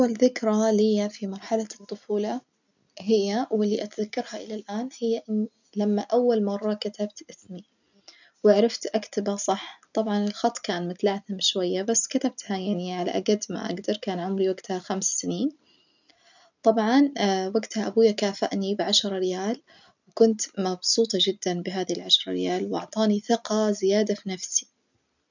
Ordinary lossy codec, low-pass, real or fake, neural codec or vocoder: none; 7.2 kHz; real; none